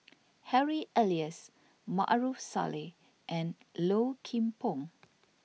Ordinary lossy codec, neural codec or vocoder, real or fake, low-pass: none; none; real; none